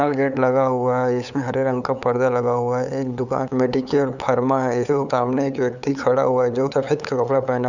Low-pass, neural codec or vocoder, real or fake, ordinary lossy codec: 7.2 kHz; codec, 16 kHz, 8 kbps, FunCodec, trained on LibriTTS, 25 frames a second; fake; none